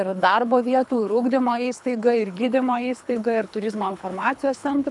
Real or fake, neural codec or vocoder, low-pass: fake; codec, 24 kHz, 3 kbps, HILCodec; 10.8 kHz